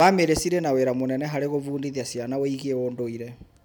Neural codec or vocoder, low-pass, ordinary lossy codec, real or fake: none; none; none; real